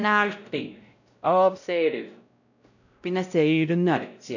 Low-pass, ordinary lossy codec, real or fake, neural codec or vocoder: 7.2 kHz; none; fake; codec, 16 kHz, 0.5 kbps, X-Codec, WavLM features, trained on Multilingual LibriSpeech